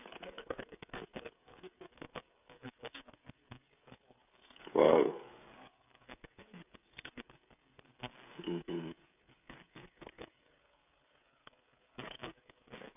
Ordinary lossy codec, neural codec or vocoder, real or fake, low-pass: none; codec, 16 kHz, 16 kbps, FreqCodec, smaller model; fake; 3.6 kHz